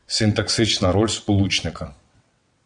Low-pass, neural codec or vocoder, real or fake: 9.9 kHz; vocoder, 22.05 kHz, 80 mel bands, WaveNeXt; fake